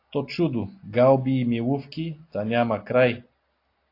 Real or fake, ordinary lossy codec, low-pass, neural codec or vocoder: real; AAC, 32 kbps; 5.4 kHz; none